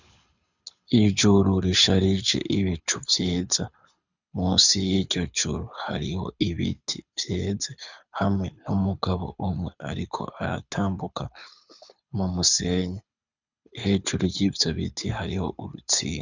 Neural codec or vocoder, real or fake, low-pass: codec, 24 kHz, 6 kbps, HILCodec; fake; 7.2 kHz